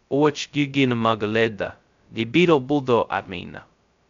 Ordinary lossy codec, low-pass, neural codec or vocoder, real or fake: MP3, 64 kbps; 7.2 kHz; codec, 16 kHz, 0.2 kbps, FocalCodec; fake